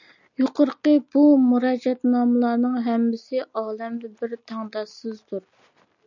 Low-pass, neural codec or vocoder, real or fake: 7.2 kHz; none; real